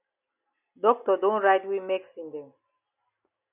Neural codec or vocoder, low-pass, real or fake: none; 3.6 kHz; real